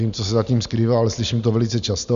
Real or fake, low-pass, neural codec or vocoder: real; 7.2 kHz; none